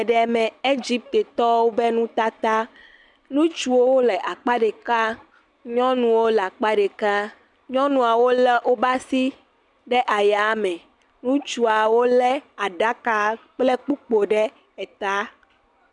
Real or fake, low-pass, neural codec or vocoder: real; 10.8 kHz; none